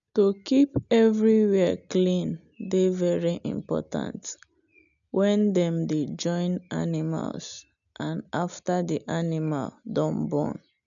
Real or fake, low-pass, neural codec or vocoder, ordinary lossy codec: real; 7.2 kHz; none; none